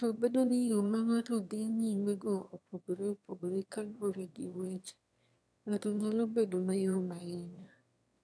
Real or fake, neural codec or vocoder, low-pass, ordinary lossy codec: fake; autoencoder, 22.05 kHz, a latent of 192 numbers a frame, VITS, trained on one speaker; none; none